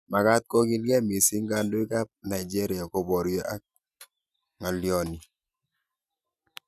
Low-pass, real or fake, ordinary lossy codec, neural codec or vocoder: 14.4 kHz; real; none; none